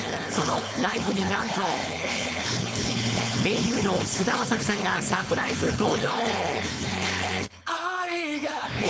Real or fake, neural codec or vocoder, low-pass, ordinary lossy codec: fake; codec, 16 kHz, 4.8 kbps, FACodec; none; none